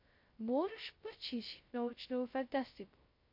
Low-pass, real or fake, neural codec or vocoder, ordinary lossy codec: 5.4 kHz; fake; codec, 16 kHz, 0.2 kbps, FocalCodec; MP3, 32 kbps